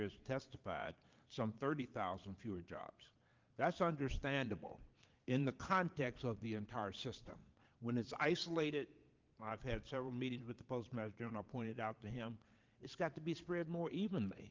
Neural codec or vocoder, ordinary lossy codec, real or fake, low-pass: vocoder, 22.05 kHz, 80 mel bands, Vocos; Opus, 24 kbps; fake; 7.2 kHz